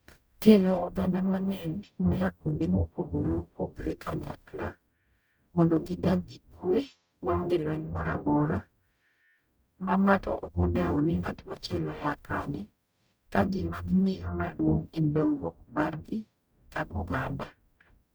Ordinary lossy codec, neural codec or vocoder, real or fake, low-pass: none; codec, 44.1 kHz, 0.9 kbps, DAC; fake; none